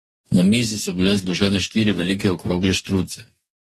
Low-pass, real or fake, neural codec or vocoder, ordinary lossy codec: 19.8 kHz; fake; codec, 44.1 kHz, 2.6 kbps, DAC; AAC, 32 kbps